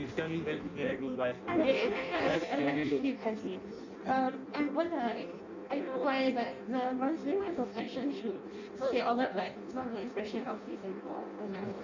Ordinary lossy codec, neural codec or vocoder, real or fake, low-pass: none; codec, 16 kHz in and 24 kHz out, 0.6 kbps, FireRedTTS-2 codec; fake; 7.2 kHz